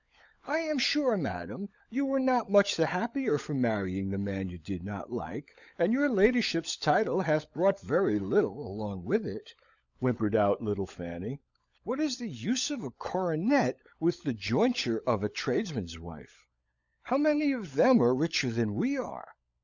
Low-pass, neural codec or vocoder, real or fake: 7.2 kHz; codec, 16 kHz, 16 kbps, FunCodec, trained on LibriTTS, 50 frames a second; fake